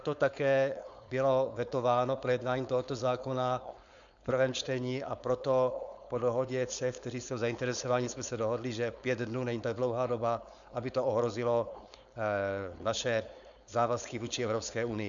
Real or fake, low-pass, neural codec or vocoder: fake; 7.2 kHz; codec, 16 kHz, 4.8 kbps, FACodec